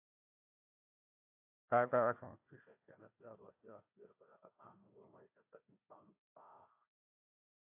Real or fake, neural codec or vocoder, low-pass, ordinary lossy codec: fake; codec, 16 kHz, 1 kbps, FunCodec, trained on Chinese and English, 50 frames a second; 3.6 kHz; AAC, 32 kbps